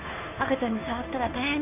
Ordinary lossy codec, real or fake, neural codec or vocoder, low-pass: none; fake; codec, 16 kHz, 2 kbps, FunCodec, trained on Chinese and English, 25 frames a second; 3.6 kHz